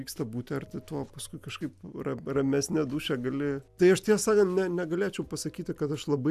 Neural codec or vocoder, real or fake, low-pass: none; real; 14.4 kHz